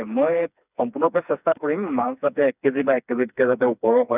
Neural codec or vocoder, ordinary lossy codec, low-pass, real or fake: codec, 16 kHz, 2 kbps, FreqCodec, smaller model; none; 3.6 kHz; fake